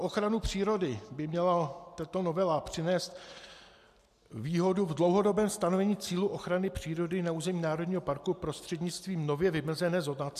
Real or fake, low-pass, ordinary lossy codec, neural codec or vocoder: real; 14.4 kHz; MP3, 96 kbps; none